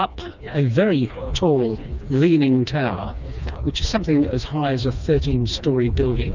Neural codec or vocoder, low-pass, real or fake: codec, 16 kHz, 2 kbps, FreqCodec, smaller model; 7.2 kHz; fake